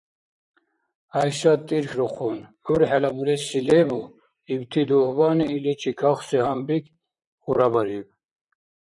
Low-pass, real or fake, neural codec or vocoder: 10.8 kHz; fake; vocoder, 44.1 kHz, 128 mel bands, Pupu-Vocoder